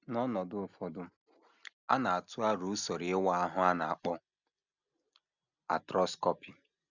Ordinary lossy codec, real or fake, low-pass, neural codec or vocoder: none; real; 7.2 kHz; none